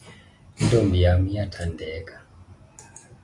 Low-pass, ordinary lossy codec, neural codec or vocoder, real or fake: 10.8 kHz; AAC, 48 kbps; none; real